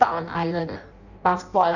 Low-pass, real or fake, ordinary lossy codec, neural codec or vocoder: 7.2 kHz; fake; none; codec, 16 kHz in and 24 kHz out, 0.6 kbps, FireRedTTS-2 codec